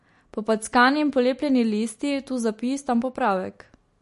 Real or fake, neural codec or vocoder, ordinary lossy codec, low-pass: real; none; MP3, 48 kbps; 14.4 kHz